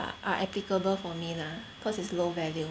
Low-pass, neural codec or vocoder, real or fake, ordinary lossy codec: none; none; real; none